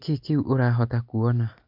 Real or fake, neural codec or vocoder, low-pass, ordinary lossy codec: real; none; 5.4 kHz; none